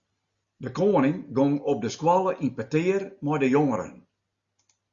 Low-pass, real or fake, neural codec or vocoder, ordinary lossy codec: 7.2 kHz; real; none; Opus, 64 kbps